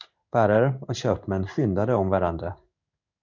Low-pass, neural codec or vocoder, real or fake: 7.2 kHz; codec, 16 kHz in and 24 kHz out, 1 kbps, XY-Tokenizer; fake